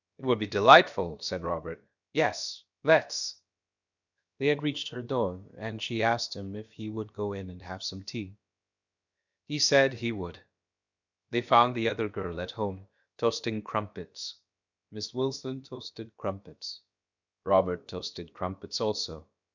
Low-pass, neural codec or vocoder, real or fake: 7.2 kHz; codec, 16 kHz, about 1 kbps, DyCAST, with the encoder's durations; fake